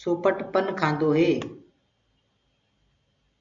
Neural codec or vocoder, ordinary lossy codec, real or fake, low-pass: none; AAC, 64 kbps; real; 7.2 kHz